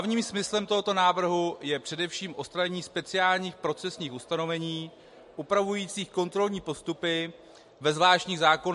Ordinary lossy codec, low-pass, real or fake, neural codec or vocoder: MP3, 48 kbps; 14.4 kHz; real; none